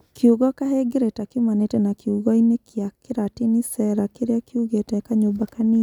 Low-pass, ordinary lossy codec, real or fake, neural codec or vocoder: 19.8 kHz; none; real; none